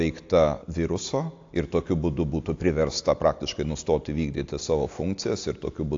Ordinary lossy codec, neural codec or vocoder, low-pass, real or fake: AAC, 48 kbps; none; 7.2 kHz; real